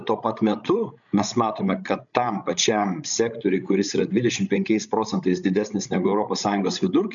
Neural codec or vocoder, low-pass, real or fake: codec, 16 kHz, 16 kbps, FreqCodec, larger model; 7.2 kHz; fake